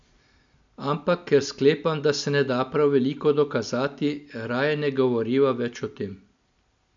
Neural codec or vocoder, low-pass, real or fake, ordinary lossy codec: none; 7.2 kHz; real; MP3, 64 kbps